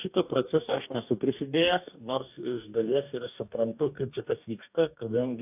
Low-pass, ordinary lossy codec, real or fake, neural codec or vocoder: 3.6 kHz; AAC, 32 kbps; fake; codec, 44.1 kHz, 2.6 kbps, DAC